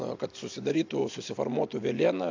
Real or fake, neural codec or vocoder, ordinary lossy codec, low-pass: real; none; AAC, 48 kbps; 7.2 kHz